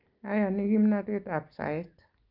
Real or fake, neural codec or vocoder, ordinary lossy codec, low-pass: real; none; Opus, 24 kbps; 5.4 kHz